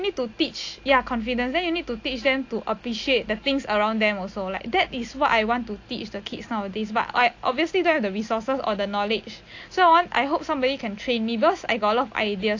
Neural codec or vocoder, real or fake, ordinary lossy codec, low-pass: none; real; AAC, 48 kbps; 7.2 kHz